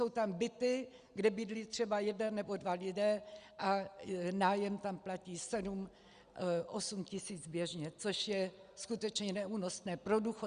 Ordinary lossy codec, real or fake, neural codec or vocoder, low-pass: Opus, 64 kbps; real; none; 9.9 kHz